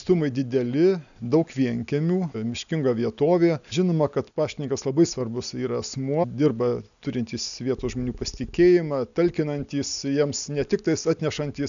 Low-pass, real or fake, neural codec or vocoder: 7.2 kHz; real; none